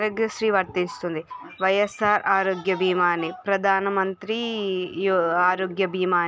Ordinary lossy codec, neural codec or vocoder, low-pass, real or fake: none; none; none; real